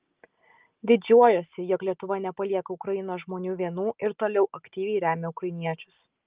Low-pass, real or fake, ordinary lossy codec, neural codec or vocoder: 3.6 kHz; real; Opus, 32 kbps; none